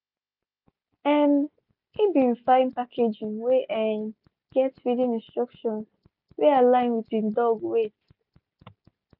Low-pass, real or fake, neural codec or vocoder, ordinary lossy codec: 5.4 kHz; real; none; none